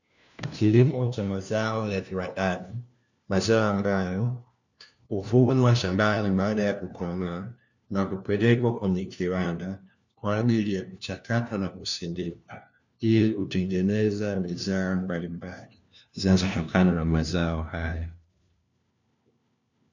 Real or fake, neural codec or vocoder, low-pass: fake; codec, 16 kHz, 1 kbps, FunCodec, trained on LibriTTS, 50 frames a second; 7.2 kHz